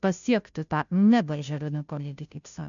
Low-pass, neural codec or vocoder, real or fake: 7.2 kHz; codec, 16 kHz, 0.5 kbps, FunCodec, trained on Chinese and English, 25 frames a second; fake